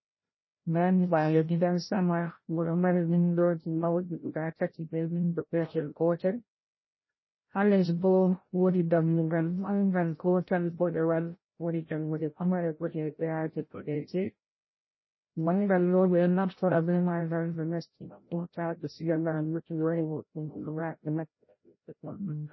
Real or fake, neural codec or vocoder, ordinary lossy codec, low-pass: fake; codec, 16 kHz, 0.5 kbps, FreqCodec, larger model; MP3, 24 kbps; 7.2 kHz